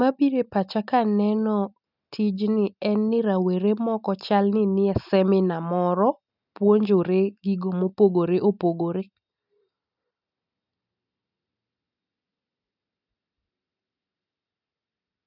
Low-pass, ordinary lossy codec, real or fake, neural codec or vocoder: 5.4 kHz; none; real; none